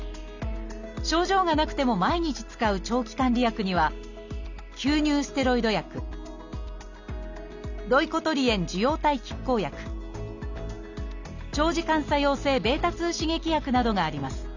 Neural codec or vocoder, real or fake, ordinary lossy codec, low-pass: none; real; none; 7.2 kHz